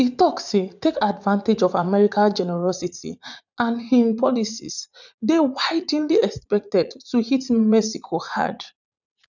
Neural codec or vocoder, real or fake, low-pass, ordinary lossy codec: vocoder, 44.1 kHz, 80 mel bands, Vocos; fake; 7.2 kHz; none